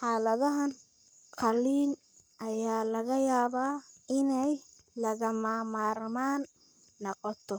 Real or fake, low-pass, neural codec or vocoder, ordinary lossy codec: fake; none; codec, 44.1 kHz, 7.8 kbps, Pupu-Codec; none